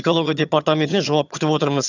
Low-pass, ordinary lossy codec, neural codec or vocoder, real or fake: 7.2 kHz; none; vocoder, 22.05 kHz, 80 mel bands, HiFi-GAN; fake